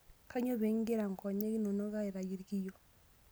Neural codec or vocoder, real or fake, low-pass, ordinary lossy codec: none; real; none; none